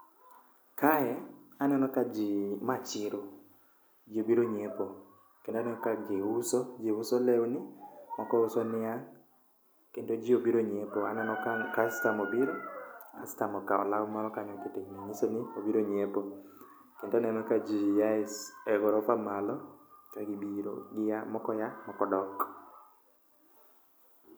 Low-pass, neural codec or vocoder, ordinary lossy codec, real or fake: none; none; none; real